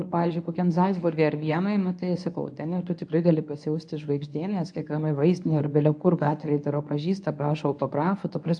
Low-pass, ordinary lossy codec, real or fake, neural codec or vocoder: 9.9 kHz; AAC, 64 kbps; fake; codec, 24 kHz, 0.9 kbps, WavTokenizer, medium speech release version 1